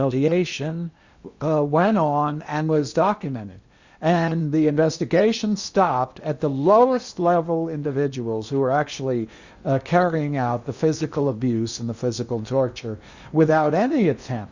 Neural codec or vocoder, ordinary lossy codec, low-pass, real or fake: codec, 16 kHz in and 24 kHz out, 0.8 kbps, FocalCodec, streaming, 65536 codes; Opus, 64 kbps; 7.2 kHz; fake